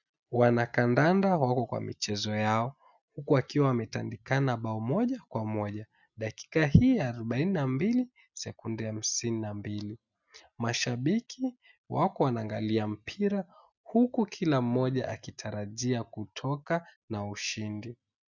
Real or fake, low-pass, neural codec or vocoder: real; 7.2 kHz; none